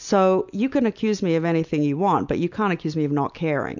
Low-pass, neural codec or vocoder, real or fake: 7.2 kHz; none; real